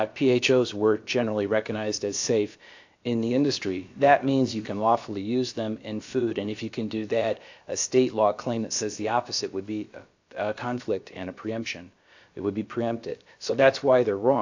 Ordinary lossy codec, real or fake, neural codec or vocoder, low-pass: AAC, 48 kbps; fake; codec, 16 kHz, about 1 kbps, DyCAST, with the encoder's durations; 7.2 kHz